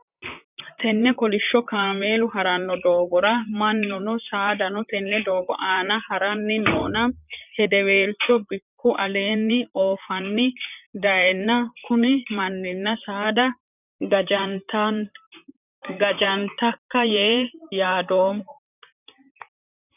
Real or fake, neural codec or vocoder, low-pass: fake; vocoder, 44.1 kHz, 128 mel bands, Pupu-Vocoder; 3.6 kHz